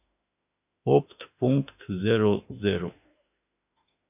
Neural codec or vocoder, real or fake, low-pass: autoencoder, 48 kHz, 32 numbers a frame, DAC-VAE, trained on Japanese speech; fake; 3.6 kHz